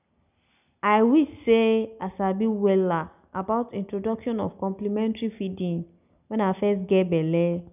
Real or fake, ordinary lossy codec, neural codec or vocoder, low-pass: real; none; none; 3.6 kHz